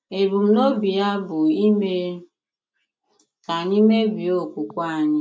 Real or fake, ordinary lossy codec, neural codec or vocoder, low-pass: real; none; none; none